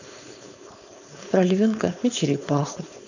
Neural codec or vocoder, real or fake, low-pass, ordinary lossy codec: codec, 16 kHz, 4.8 kbps, FACodec; fake; 7.2 kHz; none